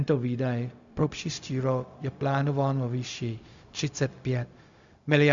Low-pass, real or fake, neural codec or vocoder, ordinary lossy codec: 7.2 kHz; fake; codec, 16 kHz, 0.4 kbps, LongCat-Audio-Codec; Opus, 64 kbps